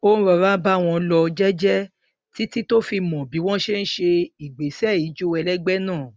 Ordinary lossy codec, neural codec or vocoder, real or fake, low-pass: none; none; real; none